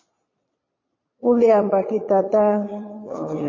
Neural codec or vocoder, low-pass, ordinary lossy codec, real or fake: vocoder, 22.05 kHz, 80 mel bands, Vocos; 7.2 kHz; MP3, 32 kbps; fake